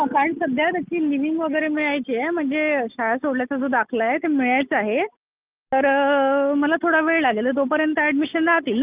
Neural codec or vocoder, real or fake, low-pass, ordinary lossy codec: none; real; 3.6 kHz; Opus, 24 kbps